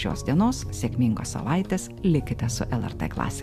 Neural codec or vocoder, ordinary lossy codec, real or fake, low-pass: none; MP3, 96 kbps; real; 14.4 kHz